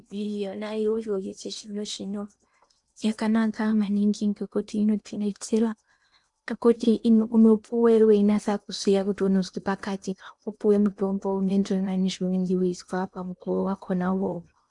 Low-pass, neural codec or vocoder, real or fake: 10.8 kHz; codec, 16 kHz in and 24 kHz out, 0.8 kbps, FocalCodec, streaming, 65536 codes; fake